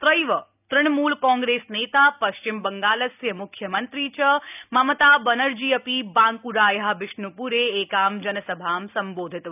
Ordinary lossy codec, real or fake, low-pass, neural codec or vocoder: none; real; 3.6 kHz; none